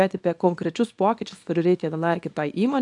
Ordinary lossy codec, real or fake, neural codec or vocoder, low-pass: AAC, 64 kbps; fake; codec, 24 kHz, 0.9 kbps, WavTokenizer, small release; 10.8 kHz